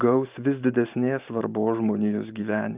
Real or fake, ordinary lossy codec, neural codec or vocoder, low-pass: fake; Opus, 24 kbps; vocoder, 44.1 kHz, 128 mel bands every 512 samples, BigVGAN v2; 3.6 kHz